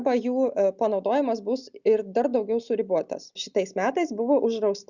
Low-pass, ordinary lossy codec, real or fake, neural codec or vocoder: 7.2 kHz; Opus, 64 kbps; real; none